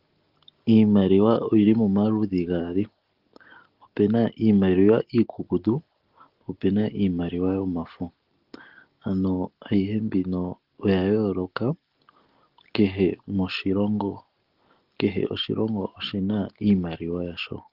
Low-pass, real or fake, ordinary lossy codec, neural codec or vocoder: 5.4 kHz; real; Opus, 16 kbps; none